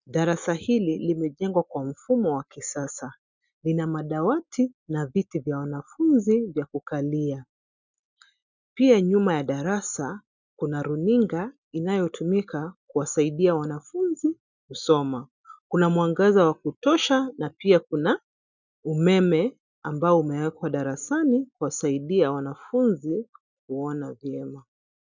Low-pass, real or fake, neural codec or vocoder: 7.2 kHz; real; none